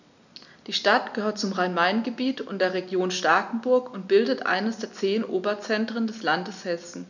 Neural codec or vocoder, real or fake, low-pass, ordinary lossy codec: none; real; 7.2 kHz; none